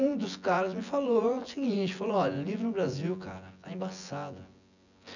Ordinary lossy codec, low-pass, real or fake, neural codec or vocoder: none; 7.2 kHz; fake; vocoder, 24 kHz, 100 mel bands, Vocos